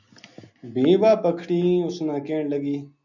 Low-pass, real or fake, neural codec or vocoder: 7.2 kHz; real; none